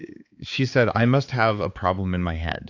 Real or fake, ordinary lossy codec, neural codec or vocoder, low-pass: fake; AAC, 48 kbps; codec, 16 kHz, 4 kbps, X-Codec, HuBERT features, trained on balanced general audio; 7.2 kHz